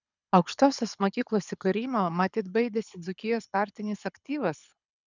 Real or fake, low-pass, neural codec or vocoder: fake; 7.2 kHz; codec, 24 kHz, 6 kbps, HILCodec